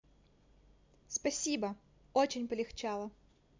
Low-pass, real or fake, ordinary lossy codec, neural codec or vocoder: 7.2 kHz; real; MP3, 64 kbps; none